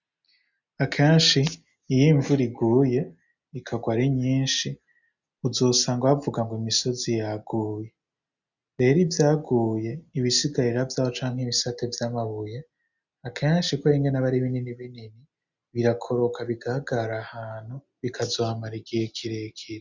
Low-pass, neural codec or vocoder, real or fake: 7.2 kHz; none; real